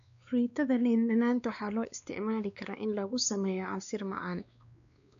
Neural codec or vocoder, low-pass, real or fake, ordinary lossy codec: codec, 16 kHz, 2 kbps, X-Codec, WavLM features, trained on Multilingual LibriSpeech; 7.2 kHz; fake; none